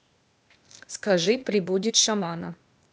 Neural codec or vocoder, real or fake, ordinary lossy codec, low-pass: codec, 16 kHz, 0.8 kbps, ZipCodec; fake; none; none